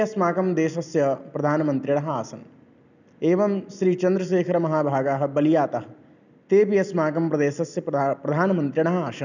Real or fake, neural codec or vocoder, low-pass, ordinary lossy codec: real; none; 7.2 kHz; none